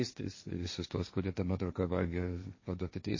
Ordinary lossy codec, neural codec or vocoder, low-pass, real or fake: MP3, 32 kbps; codec, 16 kHz, 1.1 kbps, Voila-Tokenizer; 7.2 kHz; fake